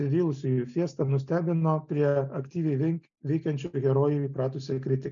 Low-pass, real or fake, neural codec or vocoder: 7.2 kHz; real; none